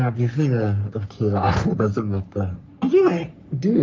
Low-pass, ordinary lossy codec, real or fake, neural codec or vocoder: 7.2 kHz; Opus, 24 kbps; fake; codec, 44.1 kHz, 3.4 kbps, Pupu-Codec